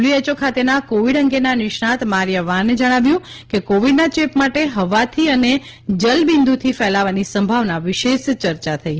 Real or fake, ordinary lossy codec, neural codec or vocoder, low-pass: real; Opus, 16 kbps; none; 7.2 kHz